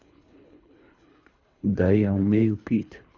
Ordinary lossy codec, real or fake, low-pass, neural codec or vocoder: none; fake; 7.2 kHz; codec, 24 kHz, 3 kbps, HILCodec